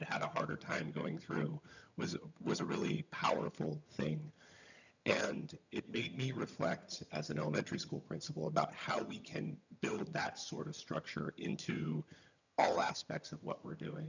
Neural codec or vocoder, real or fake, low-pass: vocoder, 22.05 kHz, 80 mel bands, HiFi-GAN; fake; 7.2 kHz